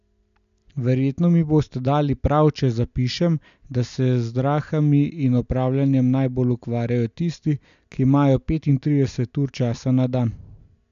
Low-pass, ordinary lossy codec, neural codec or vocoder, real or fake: 7.2 kHz; AAC, 96 kbps; none; real